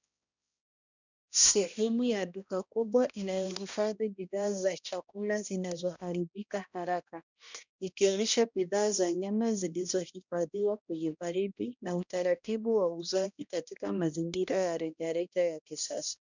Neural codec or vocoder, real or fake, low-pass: codec, 16 kHz, 1 kbps, X-Codec, HuBERT features, trained on balanced general audio; fake; 7.2 kHz